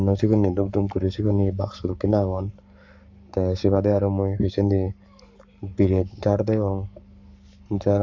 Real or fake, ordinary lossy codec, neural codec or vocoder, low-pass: fake; AAC, 48 kbps; codec, 44.1 kHz, 7.8 kbps, Pupu-Codec; 7.2 kHz